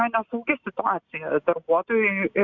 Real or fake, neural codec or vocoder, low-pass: real; none; 7.2 kHz